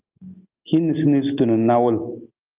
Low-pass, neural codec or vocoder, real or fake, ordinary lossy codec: 3.6 kHz; none; real; Opus, 32 kbps